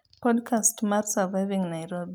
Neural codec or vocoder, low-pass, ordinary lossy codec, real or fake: none; none; none; real